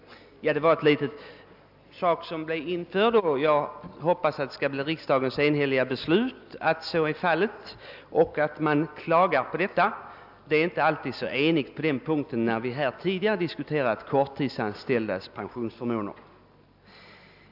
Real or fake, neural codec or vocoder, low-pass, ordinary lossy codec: real; none; 5.4 kHz; AAC, 48 kbps